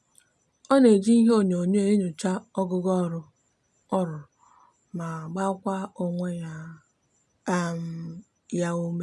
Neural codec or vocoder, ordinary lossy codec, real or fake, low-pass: none; none; real; none